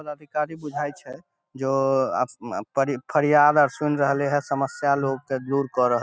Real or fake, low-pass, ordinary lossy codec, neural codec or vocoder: real; none; none; none